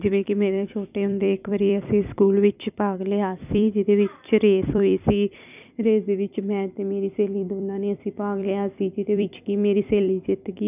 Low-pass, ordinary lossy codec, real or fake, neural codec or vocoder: 3.6 kHz; none; fake; vocoder, 44.1 kHz, 128 mel bands every 256 samples, BigVGAN v2